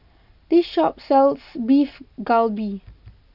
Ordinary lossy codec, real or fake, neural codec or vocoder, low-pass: none; real; none; 5.4 kHz